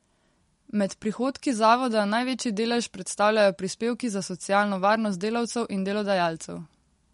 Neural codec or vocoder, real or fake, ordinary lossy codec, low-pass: none; real; MP3, 48 kbps; 14.4 kHz